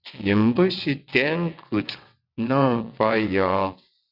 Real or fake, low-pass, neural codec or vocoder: fake; 5.4 kHz; vocoder, 22.05 kHz, 80 mel bands, WaveNeXt